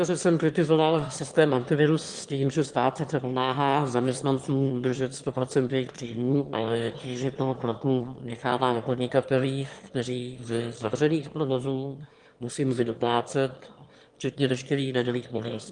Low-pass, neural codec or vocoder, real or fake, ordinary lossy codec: 9.9 kHz; autoencoder, 22.05 kHz, a latent of 192 numbers a frame, VITS, trained on one speaker; fake; Opus, 24 kbps